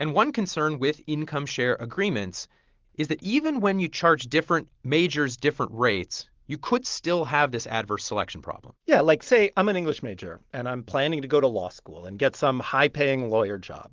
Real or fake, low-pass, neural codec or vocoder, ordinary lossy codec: real; 7.2 kHz; none; Opus, 16 kbps